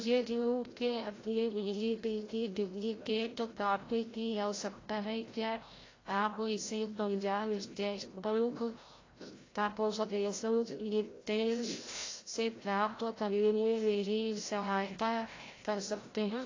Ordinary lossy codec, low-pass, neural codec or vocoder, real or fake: AAC, 32 kbps; 7.2 kHz; codec, 16 kHz, 0.5 kbps, FreqCodec, larger model; fake